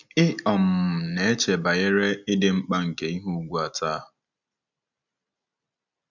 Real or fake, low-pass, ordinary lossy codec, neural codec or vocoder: real; 7.2 kHz; none; none